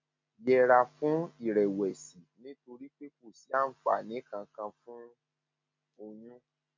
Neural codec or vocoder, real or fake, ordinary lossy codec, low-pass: none; real; MP3, 48 kbps; 7.2 kHz